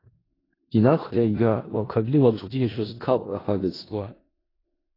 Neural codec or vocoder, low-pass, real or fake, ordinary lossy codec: codec, 16 kHz in and 24 kHz out, 0.4 kbps, LongCat-Audio-Codec, four codebook decoder; 5.4 kHz; fake; AAC, 24 kbps